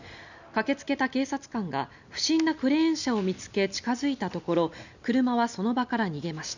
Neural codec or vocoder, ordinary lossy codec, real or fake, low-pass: none; none; real; 7.2 kHz